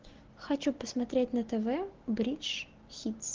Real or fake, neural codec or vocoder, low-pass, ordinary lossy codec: real; none; 7.2 kHz; Opus, 16 kbps